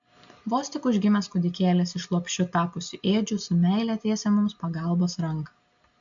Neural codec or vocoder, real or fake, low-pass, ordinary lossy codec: none; real; 7.2 kHz; AAC, 64 kbps